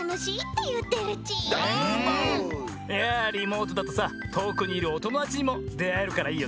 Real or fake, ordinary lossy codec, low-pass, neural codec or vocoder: real; none; none; none